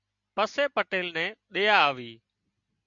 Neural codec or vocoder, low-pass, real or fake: none; 7.2 kHz; real